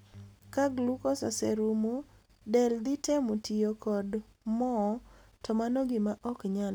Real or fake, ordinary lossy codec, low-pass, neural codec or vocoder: real; none; none; none